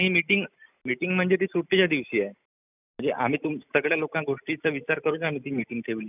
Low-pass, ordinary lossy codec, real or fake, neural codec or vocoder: 3.6 kHz; none; real; none